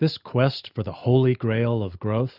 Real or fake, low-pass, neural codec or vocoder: real; 5.4 kHz; none